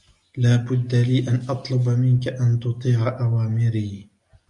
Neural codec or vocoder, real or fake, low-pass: none; real; 10.8 kHz